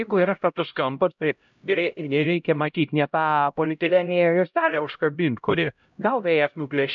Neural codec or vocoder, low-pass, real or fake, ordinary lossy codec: codec, 16 kHz, 0.5 kbps, X-Codec, HuBERT features, trained on LibriSpeech; 7.2 kHz; fake; MP3, 64 kbps